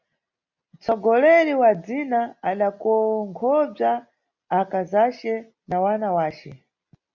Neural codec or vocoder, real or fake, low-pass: none; real; 7.2 kHz